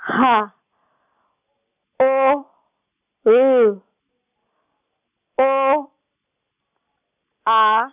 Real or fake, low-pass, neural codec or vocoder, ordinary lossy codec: real; 3.6 kHz; none; none